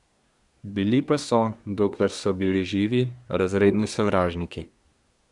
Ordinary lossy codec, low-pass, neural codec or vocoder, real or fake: none; 10.8 kHz; codec, 24 kHz, 1 kbps, SNAC; fake